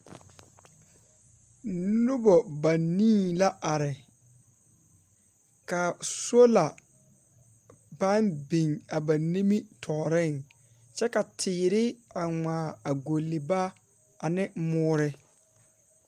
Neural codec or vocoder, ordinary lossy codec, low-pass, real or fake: none; Opus, 32 kbps; 14.4 kHz; real